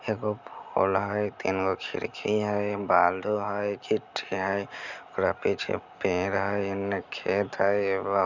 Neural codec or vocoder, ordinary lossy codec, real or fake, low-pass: none; none; real; 7.2 kHz